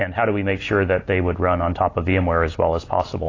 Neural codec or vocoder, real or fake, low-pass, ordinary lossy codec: none; real; 7.2 kHz; AAC, 32 kbps